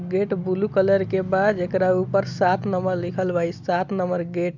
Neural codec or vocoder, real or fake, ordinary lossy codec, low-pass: none; real; none; 7.2 kHz